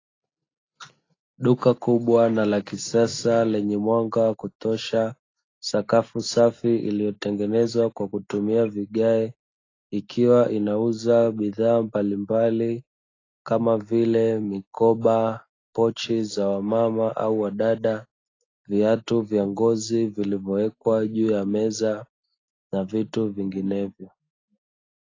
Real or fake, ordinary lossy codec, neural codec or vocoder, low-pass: real; AAC, 32 kbps; none; 7.2 kHz